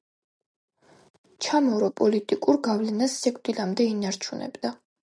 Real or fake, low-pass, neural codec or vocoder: real; 9.9 kHz; none